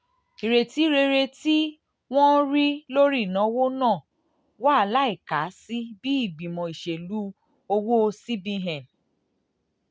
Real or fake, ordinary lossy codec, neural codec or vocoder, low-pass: real; none; none; none